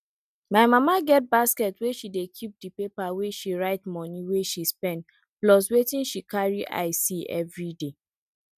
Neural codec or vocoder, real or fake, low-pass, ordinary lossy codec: none; real; 14.4 kHz; none